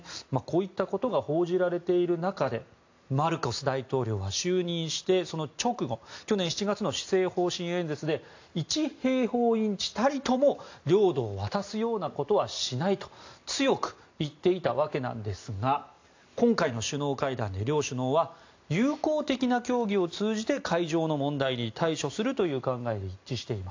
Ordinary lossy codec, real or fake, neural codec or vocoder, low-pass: AAC, 48 kbps; real; none; 7.2 kHz